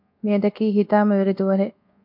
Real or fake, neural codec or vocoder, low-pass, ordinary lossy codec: fake; codec, 24 kHz, 1.2 kbps, DualCodec; 5.4 kHz; MP3, 48 kbps